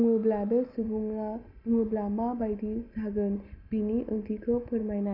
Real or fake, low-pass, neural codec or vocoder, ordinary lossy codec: real; 5.4 kHz; none; none